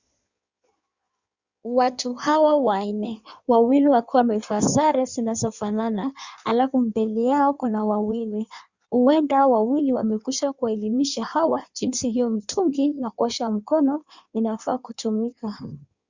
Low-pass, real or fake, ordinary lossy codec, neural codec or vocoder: 7.2 kHz; fake; Opus, 64 kbps; codec, 16 kHz in and 24 kHz out, 1.1 kbps, FireRedTTS-2 codec